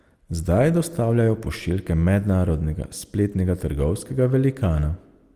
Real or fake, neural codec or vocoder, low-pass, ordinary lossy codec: real; none; 14.4 kHz; Opus, 24 kbps